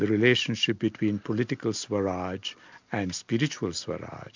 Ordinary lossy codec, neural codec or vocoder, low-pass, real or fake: MP3, 64 kbps; none; 7.2 kHz; real